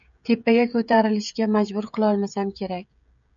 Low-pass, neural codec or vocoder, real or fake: 7.2 kHz; codec, 16 kHz, 16 kbps, FreqCodec, smaller model; fake